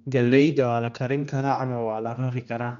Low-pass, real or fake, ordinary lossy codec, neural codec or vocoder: 7.2 kHz; fake; none; codec, 16 kHz, 1 kbps, X-Codec, HuBERT features, trained on general audio